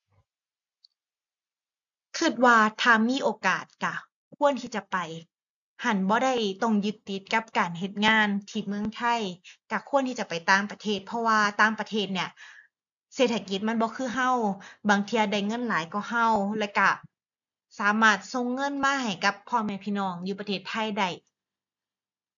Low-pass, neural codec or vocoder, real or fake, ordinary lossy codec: 7.2 kHz; none; real; none